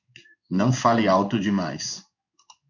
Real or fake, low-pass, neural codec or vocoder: fake; 7.2 kHz; codec, 16 kHz in and 24 kHz out, 1 kbps, XY-Tokenizer